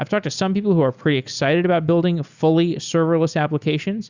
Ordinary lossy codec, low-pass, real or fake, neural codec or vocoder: Opus, 64 kbps; 7.2 kHz; real; none